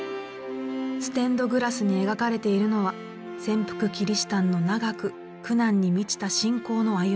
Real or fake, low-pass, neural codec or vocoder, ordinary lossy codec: real; none; none; none